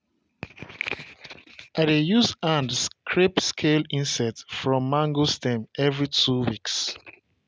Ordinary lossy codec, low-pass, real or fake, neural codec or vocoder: none; none; real; none